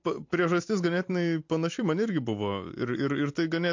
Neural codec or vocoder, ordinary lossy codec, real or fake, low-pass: vocoder, 44.1 kHz, 128 mel bands every 256 samples, BigVGAN v2; MP3, 48 kbps; fake; 7.2 kHz